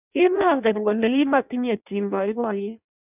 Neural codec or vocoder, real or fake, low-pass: codec, 16 kHz in and 24 kHz out, 0.6 kbps, FireRedTTS-2 codec; fake; 3.6 kHz